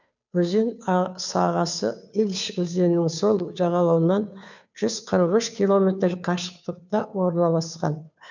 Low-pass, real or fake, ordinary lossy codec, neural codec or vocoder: 7.2 kHz; fake; none; codec, 16 kHz, 2 kbps, FunCodec, trained on Chinese and English, 25 frames a second